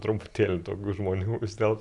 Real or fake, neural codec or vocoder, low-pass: real; none; 10.8 kHz